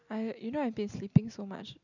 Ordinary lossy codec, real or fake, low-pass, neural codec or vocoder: none; real; 7.2 kHz; none